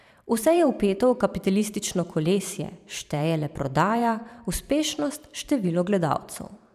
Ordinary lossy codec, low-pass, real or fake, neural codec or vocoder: none; 14.4 kHz; fake; vocoder, 44.1 kHz, 128 mel bands every 512 samples, BigVGAN v2